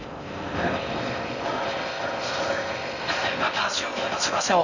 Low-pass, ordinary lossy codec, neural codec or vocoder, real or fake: 7.2 kHz; none; codec, 16 kHz in and 24 kHz out, 0.6 kbps, FocalCodec, streaming, 4096 codes; fake